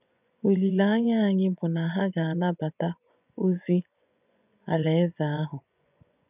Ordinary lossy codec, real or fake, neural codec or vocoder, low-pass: none; fake; vocoder, 24 kHz, 100 mel bands, Vocos; 3.6 kHz